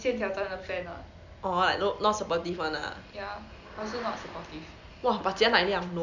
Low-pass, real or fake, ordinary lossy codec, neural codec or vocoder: 7.2 kHz; real; none; none